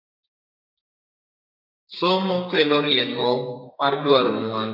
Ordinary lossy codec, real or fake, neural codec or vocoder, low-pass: AAC, 48 kbps; fake; codec, 32 kHz, 1.9 kbps, SNAC; 5.4 kHz